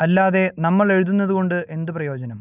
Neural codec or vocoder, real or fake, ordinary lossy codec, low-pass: none; real; none; 3.6 kHz